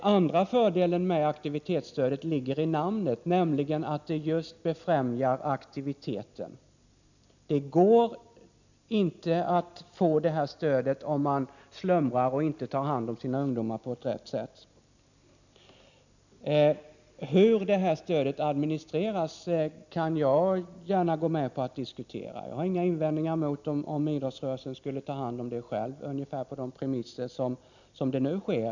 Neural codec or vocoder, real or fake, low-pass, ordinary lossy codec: none; real; 7.2 kHz; none